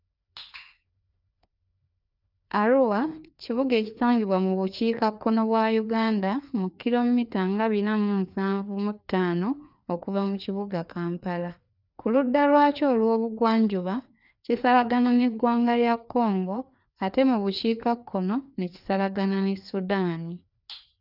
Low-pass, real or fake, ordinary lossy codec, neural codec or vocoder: 5.4 kHz; fake; none; codec, 16 kHz, 2 kbps, FreqCodec, larger model